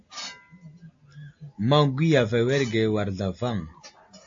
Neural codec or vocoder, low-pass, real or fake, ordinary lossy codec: none; 7.2 kHz; real; AAC, 48 kbps